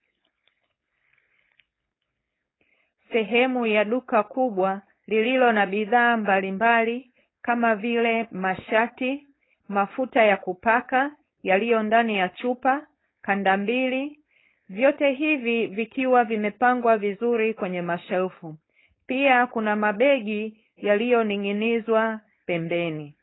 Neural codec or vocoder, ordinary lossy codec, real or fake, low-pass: codec, 16 kHz, 4.8 kbps, FACodec; AAC, 16 kbps; fake; 7.2 kHz